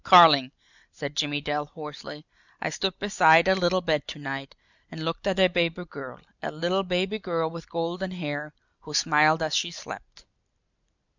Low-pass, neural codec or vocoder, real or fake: 7.2 kHz; none; real